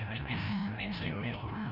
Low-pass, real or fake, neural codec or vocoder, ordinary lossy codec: 5.4 kHz; fake; codec, 16 kHz, 0.5 kbps, FreqCodec, larger model; none